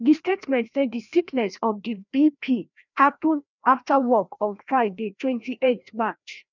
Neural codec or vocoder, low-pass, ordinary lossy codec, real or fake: codec, 16 kHz, 1 kbps, FreqCodec, larger model; 7.2 kHz; none; fake